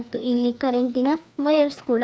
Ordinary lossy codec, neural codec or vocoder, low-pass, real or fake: none; codec, 16 kHz, 2 kbps, FreqCodec, larger model; none; fake